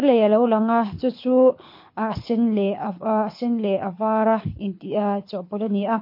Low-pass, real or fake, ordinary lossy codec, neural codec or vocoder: 5.4 kHz; fake; MP3, 32 kbps; codec, 24 kHz, 6 kbps, HILCodec